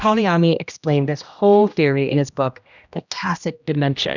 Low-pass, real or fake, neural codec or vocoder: 7.2 kHz; fake; codec, 16 kHz, 1 kbps, X-Codec, HuBERT features, trained on general audio